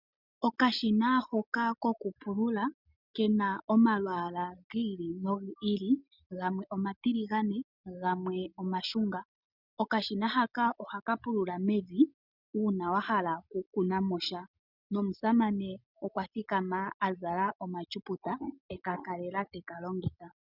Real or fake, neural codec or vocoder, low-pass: real; none; 5.4 kHz